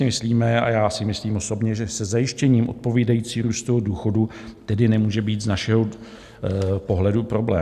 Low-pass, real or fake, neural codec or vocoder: 14.4 kHz; real; none